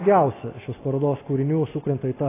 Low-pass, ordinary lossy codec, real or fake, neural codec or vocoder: 3.6 kHz; AAC, 16 kbps; real; none